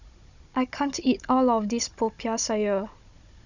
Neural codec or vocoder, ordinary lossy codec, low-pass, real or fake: codec, 16 kHz, 16 kbps, FunCodec, trained on Chinese and English, 50 frames a second; none; 7.2 kHz; fake